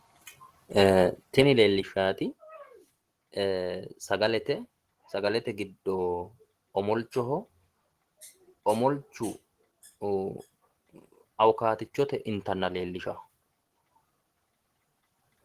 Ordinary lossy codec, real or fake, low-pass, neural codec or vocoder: Opus, 16 kbps; real; 14.4 kHz; none